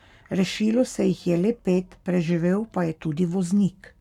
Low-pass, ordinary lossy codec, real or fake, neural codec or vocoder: 19.8 kHz; none; fake; codec, 44.1 kHz, 7.8 kbps, Pupu-Codec